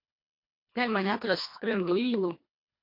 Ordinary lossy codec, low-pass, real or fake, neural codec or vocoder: MP3, 32 kbps; 5.4 kHz; fake; codec, 24 kHz, 1.5 kbps, HILCodec